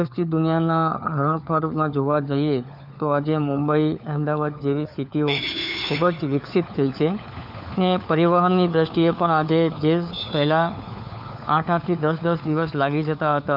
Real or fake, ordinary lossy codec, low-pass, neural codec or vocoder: fake; none; 5.4 kHz; codec, 16 kHz, 4 kbps, FunCodec, trained on LibriTTS, 50 frames a second